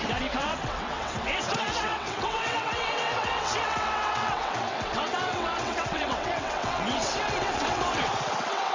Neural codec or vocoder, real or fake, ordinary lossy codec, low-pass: vocoder, 22.05 kHz, 80 mel bands, WaveNeXt; fake; MP3, 64 kbps; 7.2 kHz